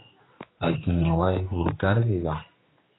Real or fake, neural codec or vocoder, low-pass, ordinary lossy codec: fake; codec, 16 kHz, 2 kbps, X-Codec, HuBERT features, trained on general audio; 7.2 kHz; AAC, 16 kbps